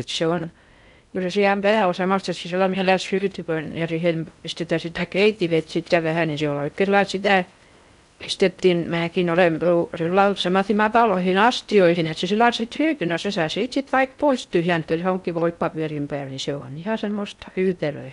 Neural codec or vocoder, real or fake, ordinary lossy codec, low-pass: codec, 16 kHz in and 24 kHz out, 0.6 kbps, FocalCodec, streaming, 4096 codes; fake; none; 10.8 kHz